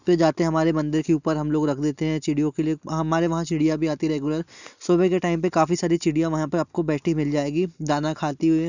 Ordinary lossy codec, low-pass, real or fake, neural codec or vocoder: none; 7.2 kHz; real; none